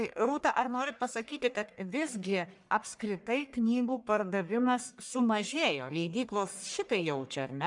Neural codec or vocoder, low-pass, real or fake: codec, 44.1 kHz, 1.7 kbps, Pupu-Codec; 10.8 kHz; fake